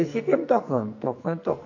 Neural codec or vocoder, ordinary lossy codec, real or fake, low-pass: codec, 44.1 kHz, 2.6 kbps, SNAC; AAC, 48 kbps; fake; 7.2 kHz